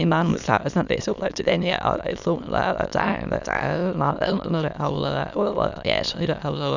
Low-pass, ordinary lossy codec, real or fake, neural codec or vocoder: 7.2 kHz; none; fake; autoencoder, 22.05 kHz, a latent of 192 numbers a frame, VITS, trained on many speakers